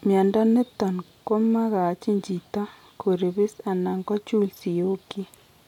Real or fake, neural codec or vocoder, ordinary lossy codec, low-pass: real; none; none; 19.8 kHz